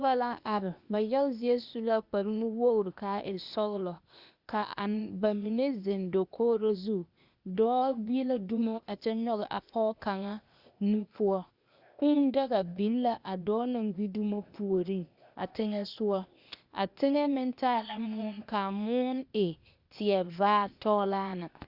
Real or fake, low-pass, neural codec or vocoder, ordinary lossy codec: fake; 5.4 kHz; codec, 16 kHz, 0.8 kbps, ZipCodec; Opus, 64 kbps